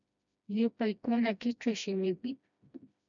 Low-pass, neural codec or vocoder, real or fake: 7.2 kHz; codec, 16 kHz, 1 kbps, FreqCodec, smaller model; fake